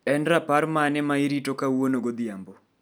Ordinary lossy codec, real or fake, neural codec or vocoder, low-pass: none; real; none; none